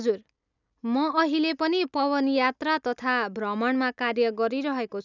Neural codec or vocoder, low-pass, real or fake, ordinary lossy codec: none; 7.2 kHz; real; none